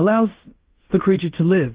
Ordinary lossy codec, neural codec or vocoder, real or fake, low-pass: Opus, 16 kbps; codec, 16 kHz in and 24 kHz out, 0.9 kbps, LongCat-Audio-Codec, fine tuned four codebook decoder; fake; 3.6 kHz